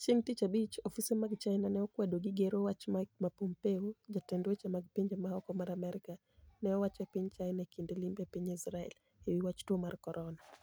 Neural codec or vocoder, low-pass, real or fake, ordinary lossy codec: none; none; real; none